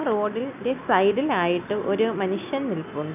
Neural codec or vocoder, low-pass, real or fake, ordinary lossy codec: none; 3.6 kHz; real; none